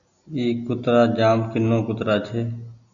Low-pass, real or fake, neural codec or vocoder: 7.2 kHz; real; none